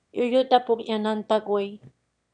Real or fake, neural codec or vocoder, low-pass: fake; autoencoder, 22.05 kHz, a latent of 192 numbers a frame, VITS, trained on one speaker; 9.9 kHz